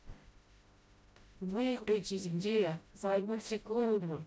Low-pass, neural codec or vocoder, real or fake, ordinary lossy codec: none; codec, 16 kHz, 0.5 kbps, FreqCodec, smaller model; fake; none